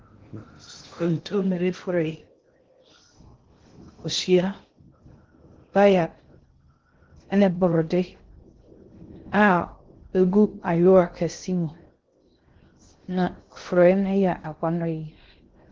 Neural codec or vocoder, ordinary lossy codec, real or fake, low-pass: codec, 16 kHz in and 24 kHz out, 0.6 kbps, FocalCodec, streaming, 2048 codes; Opus, 16 kbps; fake; 7.2 kHz